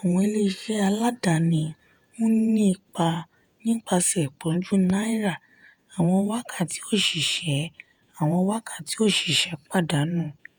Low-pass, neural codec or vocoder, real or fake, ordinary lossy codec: none; vocoder, 48 kHz, 128 mel bands, Vocos; fake; none